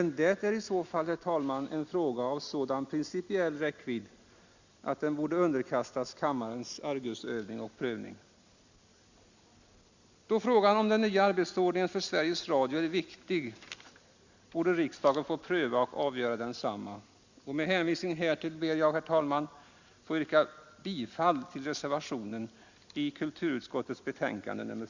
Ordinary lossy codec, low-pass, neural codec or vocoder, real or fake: Opus, 64 kbps; 7.2 kHz; none; real